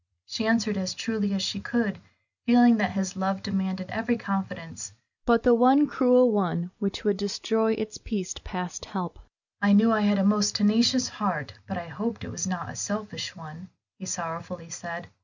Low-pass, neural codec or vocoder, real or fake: 7.2 kHz; none; real